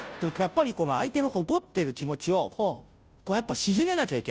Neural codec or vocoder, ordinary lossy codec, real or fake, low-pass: codec, 16 kHz, 0.5 kbps, FunCodec, trained on Chinese and English, 25 frames a second; none; fake; none